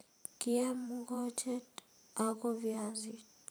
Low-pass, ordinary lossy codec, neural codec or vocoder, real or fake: none; none; vocoder, 44.1 kHz, 128 mel bands every 512 samples, BigVGAN v2; fake